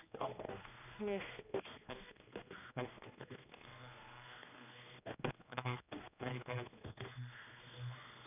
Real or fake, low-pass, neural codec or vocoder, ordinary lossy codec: fake; 3.6 kHz; codec, 16 kHz, 2 kbps, X-Codec, HuBERT features, trained on general audio; none